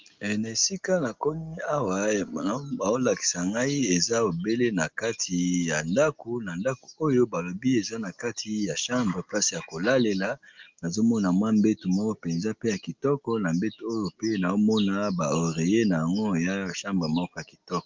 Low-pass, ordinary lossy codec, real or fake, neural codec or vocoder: 7.2 kHz; Opus, 24 kbps; real; none